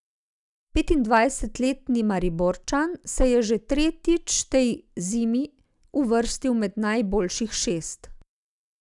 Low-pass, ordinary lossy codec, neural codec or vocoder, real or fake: 10.8 kHz; none; none; real